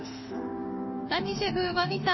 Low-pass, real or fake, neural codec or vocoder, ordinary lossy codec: 7.2 kHz; fake; autoencoder, 48 kHz, 32 numbers a frame, DAC-VAE, trained on Japanese speech; MP3, 24 kbps